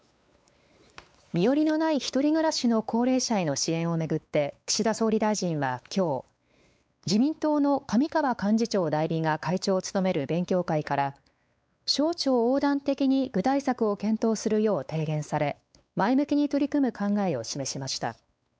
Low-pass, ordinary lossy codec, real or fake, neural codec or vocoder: none; none; fake; codec, 16 kHz, 4 kbps, X-Codec, WavLM features, trained on Multilingual LibriSpeech